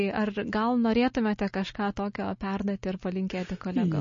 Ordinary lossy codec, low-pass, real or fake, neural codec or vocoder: MP3, 32 kbps; 7.2 kHz; real; none